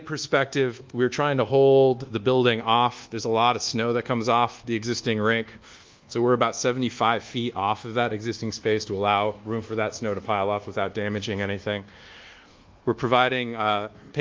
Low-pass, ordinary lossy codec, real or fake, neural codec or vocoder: 7.2 kHz; Opus, 32 kbps; fake; codec, 24 kHz, 1.2 kbps, DualCodec